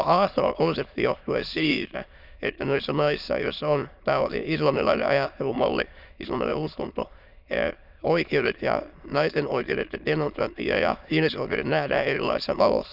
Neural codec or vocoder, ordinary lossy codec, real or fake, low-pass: autoencoder, 22.05 kHz, a latent of 192 numbers a frame, VITS, trained on many speakers; none; fake; 5.4 kHz